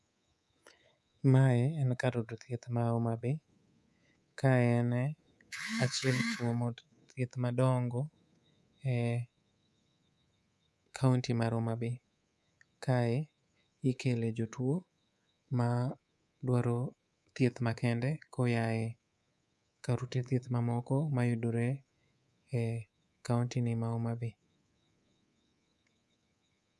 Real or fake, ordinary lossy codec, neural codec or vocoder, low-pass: fake; none; codec, 24 kHz, 3.1 kbps, DualCodec; none